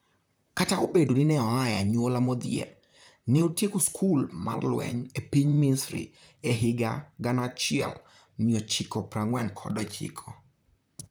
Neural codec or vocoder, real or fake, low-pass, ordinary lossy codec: vocoder, 44.1 kHz, 128 mel bands, Pupu-Vocoder; fake; none; none